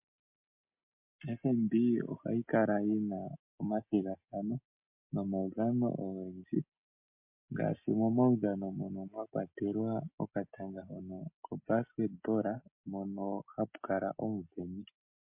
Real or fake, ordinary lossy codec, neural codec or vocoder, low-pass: real; MP3, 32 kbps; none; 3.6 kHz